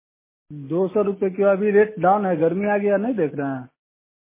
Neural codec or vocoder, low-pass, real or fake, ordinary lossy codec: none; 3.6 kHz; real; MP3, 16 kbps